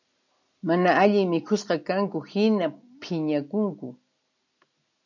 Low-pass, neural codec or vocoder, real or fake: 7.2 kHz; none; real